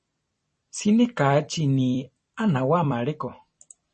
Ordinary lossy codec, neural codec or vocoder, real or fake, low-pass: MP3, 32 kbps; vocoder, 44.1 kHz, 128 mel bands every 256 samples, BigVGAN v2; fake; 10.8 kHz